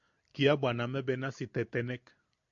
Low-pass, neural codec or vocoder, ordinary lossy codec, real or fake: 7.2 kHz; none; AAC, 64 kbps; real